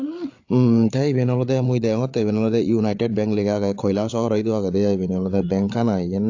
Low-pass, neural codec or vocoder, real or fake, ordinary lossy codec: 7.2 kHz; codec, 16 kHz, 8 kbps, FreqCodec, larger model; fake; AAC, 48 kbps